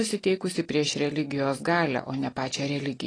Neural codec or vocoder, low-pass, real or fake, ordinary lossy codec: none; 9.9 kHz; real; AAC, 32 kbps